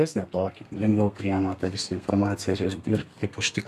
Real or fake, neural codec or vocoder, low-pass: fake; codec, 32 kHz, 1.9 kbps, SNAC; 14.4 kHz